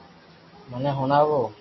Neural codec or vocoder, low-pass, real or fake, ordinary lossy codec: none; 7.2 kHz; real; MP3, 24 kbps